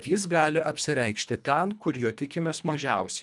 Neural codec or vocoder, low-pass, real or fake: codec, 24 kHz, 1.5 kbps, HILCodec; 10.8 kHz; fake